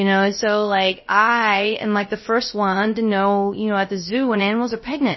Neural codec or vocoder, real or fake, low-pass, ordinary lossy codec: codec, 16 kHz, 0.3 kbps, FocalCodec; fake; 7.2 kHz; MP3, 24 kbps